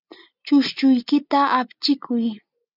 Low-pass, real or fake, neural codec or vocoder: 5.4 kHz; real; none